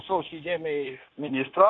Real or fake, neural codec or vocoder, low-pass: fake; codec, 16 kHz, 0.9 kbps, LongCat-Audio-Codec; 7.2 kHz